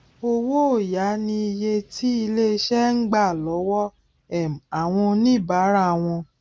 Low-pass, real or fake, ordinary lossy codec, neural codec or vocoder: none; real; none; none